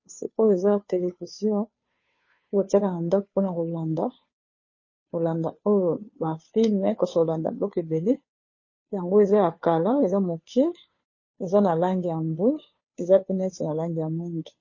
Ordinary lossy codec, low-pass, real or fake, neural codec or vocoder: MP3, 32 kbps; 7.2 kHz; fake; codec, 16 kHz, 2 kbps, FunCodec, trained on Chinese and English, 25 frames a second